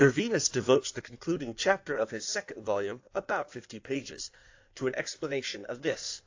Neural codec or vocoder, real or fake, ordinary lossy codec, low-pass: codec, 16 kHz in and 24 kHz out, 1.1 kbps, FireRedTTS-2 codec; fake; AAC, 48 kbps; 7.2 kHz